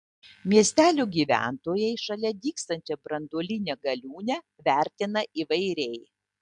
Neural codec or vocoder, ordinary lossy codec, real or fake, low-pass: none; MP3, 64 kbps; real; 10.8 kHz